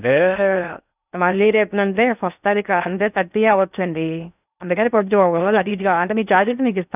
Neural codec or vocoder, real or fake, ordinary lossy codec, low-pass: codec, 16 kHz in and 24 kHz out, 0.6 kbps, FocalCodec, streaming, 2048 codes; fake; none; 3.6 kHz